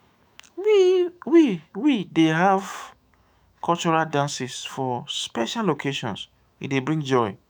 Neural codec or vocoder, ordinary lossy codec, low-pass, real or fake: autoencoder, 48 kHz, 128 numbers a frame, DAC-VAE, trained on Japanese speech; none; none; fake